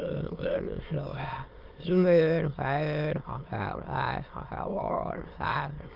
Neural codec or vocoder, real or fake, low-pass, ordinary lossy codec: autoencoder, 22.05 kHz, a latent of 192 numbers a frame, VITS, trained on many speakers; fake; 5.4 kHz; Opus, 32 kbps